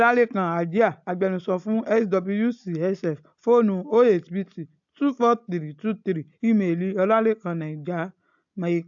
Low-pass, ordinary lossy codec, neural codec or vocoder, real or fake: 7.2 kHz; AAC, 64 kbps; none; real